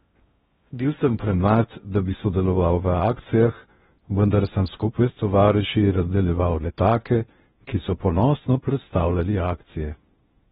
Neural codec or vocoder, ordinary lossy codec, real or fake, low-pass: codec, 16 kHz in and 24 kHz out, 0.6 kbps, FocalCodec, streaming, 2048 codes; AAC, 16 kbps; fake; 10.8 kHz